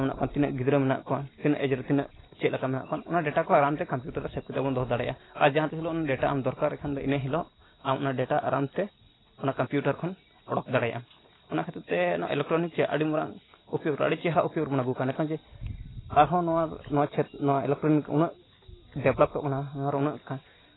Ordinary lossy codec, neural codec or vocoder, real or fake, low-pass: AAC, 16 kbps; none; real; 7.2 kHz